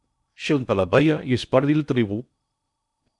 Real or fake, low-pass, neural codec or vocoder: fake; 10.8 kHz; codec, 16 kHz in and 24 kHz out, 0.6 kbps, FocalCodec, streaming, 2048 codes